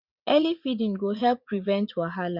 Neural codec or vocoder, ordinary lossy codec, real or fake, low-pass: vocoder, 44.1 kHz, 80 mel bands, Vocos; none; fake; 5.4 kHz